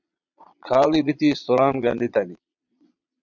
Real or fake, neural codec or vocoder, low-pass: fake; vocoder, 22.05 kHz, 80 mel bands, Vocos; 7.2 kHz